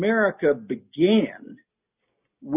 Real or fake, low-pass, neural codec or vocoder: real; 3.6 kHz; none